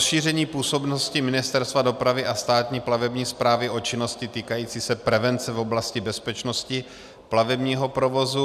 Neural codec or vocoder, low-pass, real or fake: none; 14.4 kHz; real